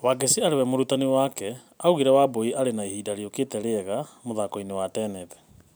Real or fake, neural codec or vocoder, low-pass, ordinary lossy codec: real; none; none; none